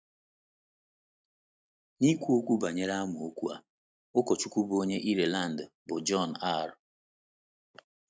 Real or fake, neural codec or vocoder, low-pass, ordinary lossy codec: real; none; none; none